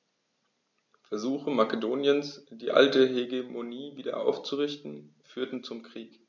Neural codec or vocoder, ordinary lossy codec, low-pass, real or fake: none; none; 7.2 kHz; real